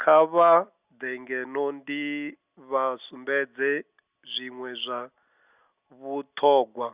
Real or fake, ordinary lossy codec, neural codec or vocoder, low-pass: real; Opus, 64 kbps; none; 3.6 kHz